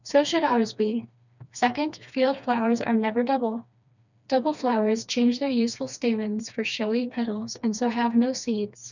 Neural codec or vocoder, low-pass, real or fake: codec, 16 kHz, 2 kbps, FreqCodec, smaller model; 7.2 kHz; fake